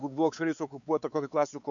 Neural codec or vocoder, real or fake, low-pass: codec, 16 kHz, 4 kbps, X-Codec, WavLM features, trained on Multilingual LibriSpeech; fake; 7.2 kHz